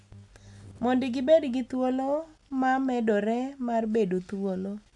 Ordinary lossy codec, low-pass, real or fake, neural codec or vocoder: none; 10.8 kHz; real; none